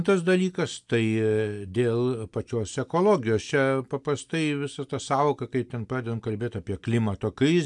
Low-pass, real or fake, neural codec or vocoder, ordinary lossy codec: 10.8 kHz; real; none; MP3, 96 kbps